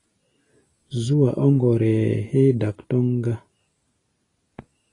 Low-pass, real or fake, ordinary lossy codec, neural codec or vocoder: 10.8 kHz; real; AAC, 32 kbps; none